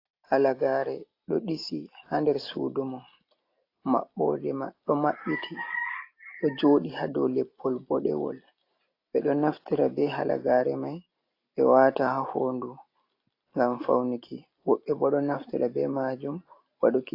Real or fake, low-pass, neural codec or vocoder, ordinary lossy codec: real; 5.4 kHz; none; AAC, 32 kbps